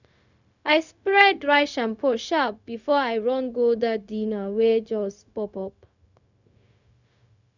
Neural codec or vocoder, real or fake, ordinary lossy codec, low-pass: codec, 16 kHz, 0.4 kbps, LongCat-Audio-Codec; fake; none; 7.2 kHz